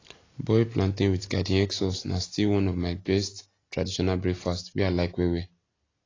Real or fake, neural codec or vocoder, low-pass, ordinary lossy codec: real; none; 7.2 kHz; AAC, 32 kbps